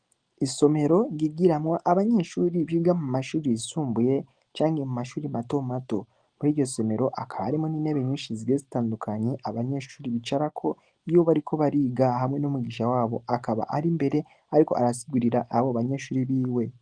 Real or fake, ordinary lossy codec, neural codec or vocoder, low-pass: real; Opus, 24 kbps; none; 9.9 kHz